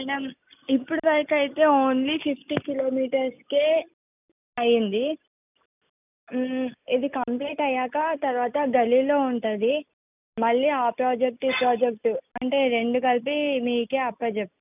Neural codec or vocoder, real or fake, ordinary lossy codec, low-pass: none; real; none; 3.6 kHz